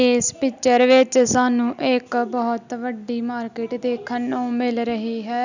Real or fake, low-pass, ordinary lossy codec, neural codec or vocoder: real; 7.2 kHz; none; none